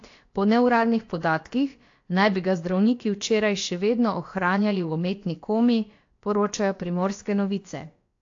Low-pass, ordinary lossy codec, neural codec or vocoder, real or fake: 7.2 kHz; AAC, 48 kbps; codec, 16 kHz, about 1 kbps, DyCAST, with the encoder's durations; fake